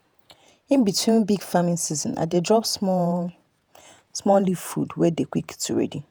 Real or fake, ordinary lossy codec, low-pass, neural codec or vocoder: fake; none; none; vocoder, 48 kHz, 128 mel bands, Vocos